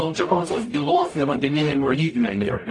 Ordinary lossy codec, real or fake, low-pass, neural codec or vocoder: AAC, 48 kbps; fake; 10.8 kHz; codec, 44.1 kHz, 0.9 kbps, DAC